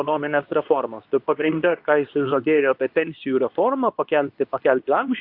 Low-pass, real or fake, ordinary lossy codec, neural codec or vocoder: 5.4 kHz; fake; AAC, 48 kbps; codec, 24 kHz, 0.9 kbps, WavTokenizer, medium speech release version 2